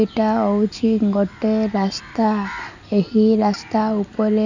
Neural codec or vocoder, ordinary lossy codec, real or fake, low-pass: none; none; real; 7.2 kHz